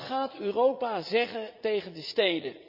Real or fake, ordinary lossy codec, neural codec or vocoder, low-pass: fake; Opus, 64 kbps; vocoder, 44.1 kHz, 80 mel bands, Vocos; 5.4 kHz